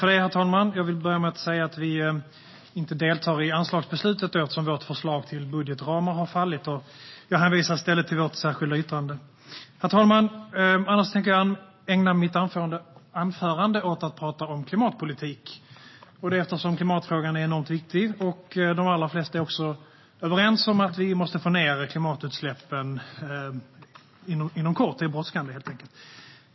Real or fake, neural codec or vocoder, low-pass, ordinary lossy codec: real; none; 7.2 kHz; MP3, 24 kbps